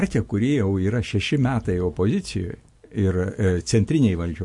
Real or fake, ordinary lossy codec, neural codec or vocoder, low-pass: real; MP3, 48 kbps; none; 10.8 kHz